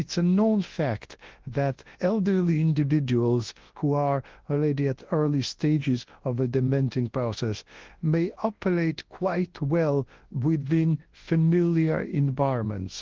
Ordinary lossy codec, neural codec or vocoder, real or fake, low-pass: Opus, 16 kbps; codec, 24 kHz, 0.9 kbps, WavTokenizer, large speech release; fake; 7.2 kHz